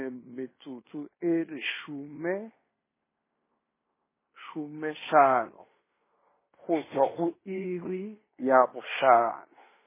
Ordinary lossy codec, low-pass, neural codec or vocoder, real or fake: MP3, 16 kbps; 3.6 kHz; codec, 16 kHz in and 24 kHz out, 0.9 kbps, LongCat-Audio-Codec, fine tuned four codebook decoder; fake